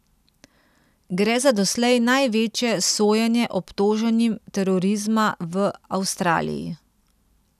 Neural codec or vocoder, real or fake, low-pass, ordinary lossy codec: none; real; 14.4 kHz; none